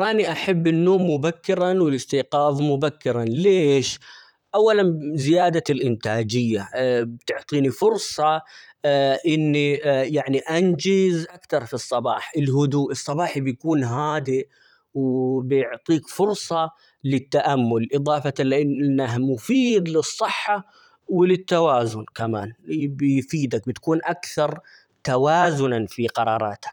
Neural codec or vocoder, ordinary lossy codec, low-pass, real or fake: vocoder, 44.1 kHz, 128 mel bands, Pupu-Vocoder; none; 19.8 kHz; fake